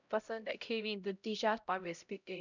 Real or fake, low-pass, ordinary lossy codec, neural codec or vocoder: fake; 7.2 kHz; none; codec, 16 kHz, 0.5 kbps, X-Codec, HuBERT features, trained on LibriSpeech